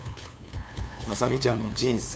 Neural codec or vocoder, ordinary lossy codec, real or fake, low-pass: codec, 16 kHz, 2 kbps, FunCodec, trained on LibriTTS, 25 frames a second; none; fake; none